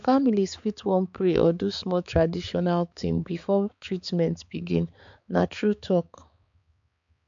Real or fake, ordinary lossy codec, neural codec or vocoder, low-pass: fake; MP3, 64 kbps; codec, 16 kHz, 4 kbps, X-Codec, HuBERT features, trained on balanced general audio; 7.2 kHz